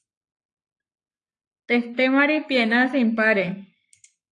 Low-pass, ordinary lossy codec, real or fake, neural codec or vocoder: 10.8 kHz; AAC, 48 kbps; fake; codec, 44.1 kHz, 7.8 kbps, Pupu-Codec